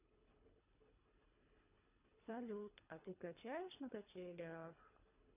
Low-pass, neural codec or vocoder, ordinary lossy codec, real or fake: 3.6 kHz; codec, 24 kHz, 3 kbps, HILCodec; none; fake